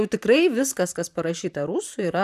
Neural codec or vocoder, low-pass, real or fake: vocoder, 44.1 kHz, 128 mel bands, Pupu-Vocoder; 14.4 kHz; fake